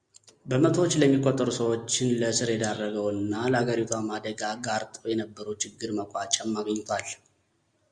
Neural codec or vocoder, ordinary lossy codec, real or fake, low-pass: none; Opus, 64 kbps; real; 9.9 kHz